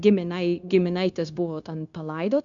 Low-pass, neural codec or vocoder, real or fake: 7.2 kHz; codec, 16 kHz, 0.9 kbps, LongCat-Audio-Codec; fake